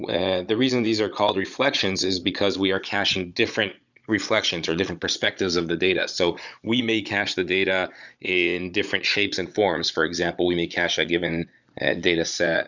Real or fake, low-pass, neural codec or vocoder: fake; 7.2 kHz; vocoder, 22.05 kHz, 80 mel bands, Vocos